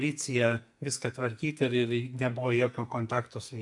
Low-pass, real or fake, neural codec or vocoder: 10.8 kHz; fake; codec, 44.1 kHz, 2.6 kbps, SNAC